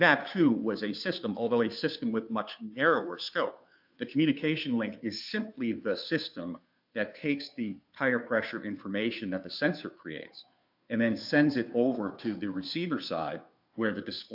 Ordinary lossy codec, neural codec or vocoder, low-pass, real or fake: AAC, 48 kbps; codec, 16 kHz, 2 kbps, FunCodec, trained on Chinese and English, 25 frames a second; 5.4 kHz; fake